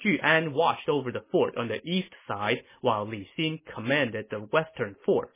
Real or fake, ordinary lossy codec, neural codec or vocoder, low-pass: real; MP3, 16 kbps; none; 3.6 kHz